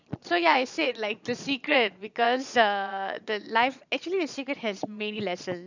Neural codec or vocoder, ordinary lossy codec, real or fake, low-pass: vocoder, 22.05 kHz, 80 mel bands, Vocos; none; fake; 7.2 kHz